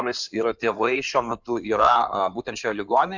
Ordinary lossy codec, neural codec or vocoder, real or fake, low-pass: Opus, 64 kbps; codec, 16 kHz in and 24 kHz out, 2.2 kbps, FireRedTTS-2 codec; fake; 7.2 kHz